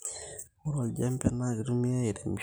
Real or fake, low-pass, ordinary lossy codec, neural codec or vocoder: real; none; none; none